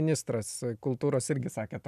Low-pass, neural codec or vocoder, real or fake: 14.4 kHz; none; real